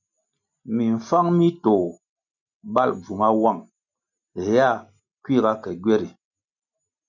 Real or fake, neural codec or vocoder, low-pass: real; none; 7.2 kHz